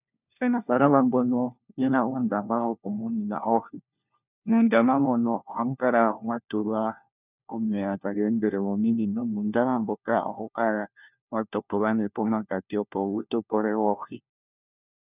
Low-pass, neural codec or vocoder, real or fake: 3.6 kHz; codec, 16 kHz, 1 kbps, FunCodec, trained on LibriTTS, 50 frames a second; fake